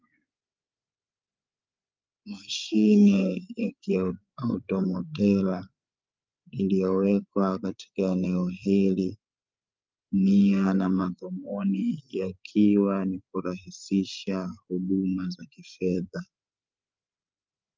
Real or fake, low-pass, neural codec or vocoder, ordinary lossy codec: fake; 7.2 kHz; codec, 16 kHz, 8 kbps, FreqCodec, larger model; Opus, 24 kbps